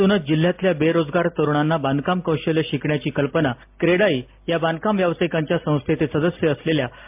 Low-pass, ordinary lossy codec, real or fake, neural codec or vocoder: 3.6 kHz; MP3, 32 kbps; real; none